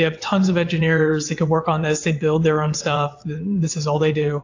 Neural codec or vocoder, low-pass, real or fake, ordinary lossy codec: vocoder, 22.05 kHz, 80 mel bands, WaveNeXt; 7.2 kHz; fake; AAC, 48 kbps